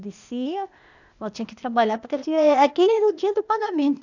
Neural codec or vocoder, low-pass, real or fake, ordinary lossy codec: codec, 16 kHz, 0.8 kbps, ZipCodec; 7.2 kHz; fake; none